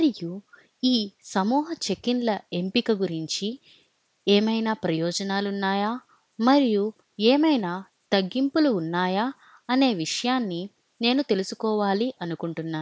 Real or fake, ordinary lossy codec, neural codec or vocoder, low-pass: real; none; none; none